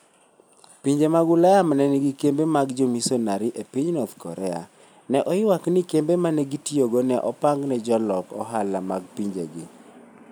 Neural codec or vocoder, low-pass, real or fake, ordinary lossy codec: none; none; real; none